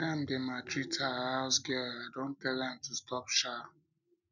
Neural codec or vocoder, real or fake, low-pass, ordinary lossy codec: none; real; 7.2 kHz; none